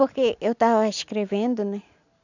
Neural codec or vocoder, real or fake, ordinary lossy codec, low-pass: none; real; none; 7.2 kHz